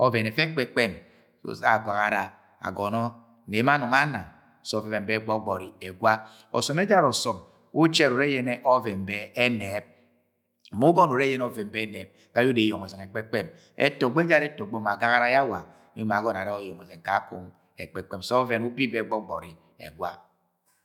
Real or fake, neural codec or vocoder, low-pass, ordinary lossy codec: fake; autoencoder, 48 kHz, 128 numbers a frame, DAC-VAE, trained on Japanese speech; 19.8 kHz; none